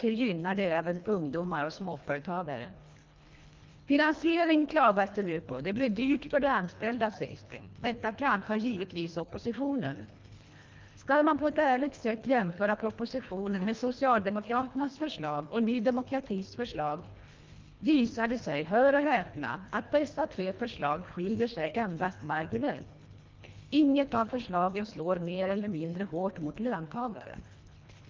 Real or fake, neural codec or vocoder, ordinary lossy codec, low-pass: fake; codec, 24 kHz, 1.5 kbps, HILCodec; Opus, 24 kbps; 7.2 kHz